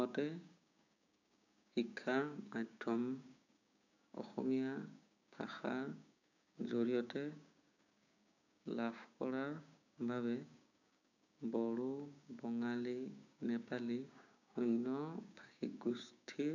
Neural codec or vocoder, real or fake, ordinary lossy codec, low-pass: codec, 16 kHz, 6 kbps, DAC; fake; none; 7.2 kHz